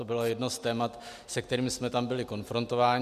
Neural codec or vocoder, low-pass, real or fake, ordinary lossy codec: none; 14.4 kHz; real; MP3, 96 kbps